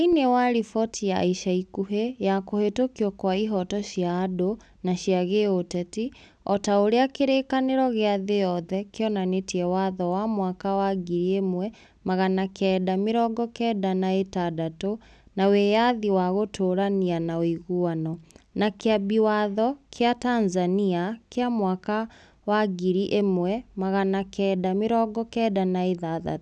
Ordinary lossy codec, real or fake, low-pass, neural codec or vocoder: none; real; none; none